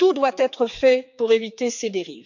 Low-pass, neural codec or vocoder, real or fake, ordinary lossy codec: 7.2 kHz; codec, 16 kHz, 4 kbps, X-Codec, HuBERT features, trained on general audio; fake; none